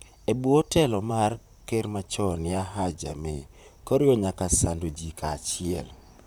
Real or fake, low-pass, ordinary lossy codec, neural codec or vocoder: fake; none; none; vocoder, 44.1 kHz, 128 mel bands, Pupu-Vocoder